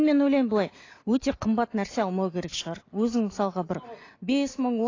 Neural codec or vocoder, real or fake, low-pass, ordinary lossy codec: none; real; 7.2 kHz; AAC, 32 kbps